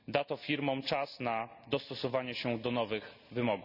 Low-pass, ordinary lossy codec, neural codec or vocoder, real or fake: 5.4 kHz; none; none; real